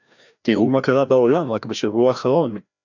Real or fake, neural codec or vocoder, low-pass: fake; codec, 16 kHz, 1 kbps, FreqCodec, larger model; 7.2 kHz